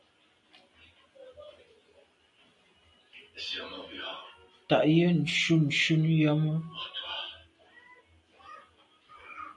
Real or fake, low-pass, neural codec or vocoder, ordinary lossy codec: real; 10.8 kHz; none; MP3, 48 kbps